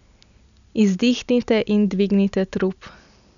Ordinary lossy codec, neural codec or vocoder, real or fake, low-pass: none; none; real; 7.2 kHz